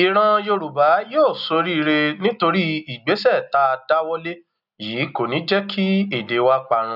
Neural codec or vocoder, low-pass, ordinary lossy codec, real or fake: none; 5.4 kHz; none; real